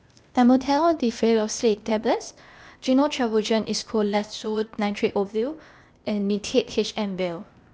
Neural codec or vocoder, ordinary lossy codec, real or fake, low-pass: codec, 16 kHz, 0.8 kbps, ZipCodec; none; fake; none